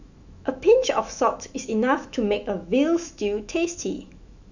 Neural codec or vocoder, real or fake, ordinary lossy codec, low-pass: autoencoder, 48 kHz, 128 numbers a frame, DAC-VAE, trained on Japanese speech; fake; none; 7.2 kHz